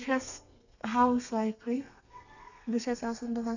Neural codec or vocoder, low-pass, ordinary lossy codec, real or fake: codec, 32 kHz, 1.9 kbps, SNAC; 7.2 kHz; none; fake